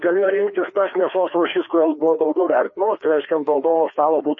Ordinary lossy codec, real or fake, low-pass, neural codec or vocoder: MP3, 32 kbps; fake; 7.2 kHz; codec, 16 kHz, 2 kbps, FreqCodec, larger model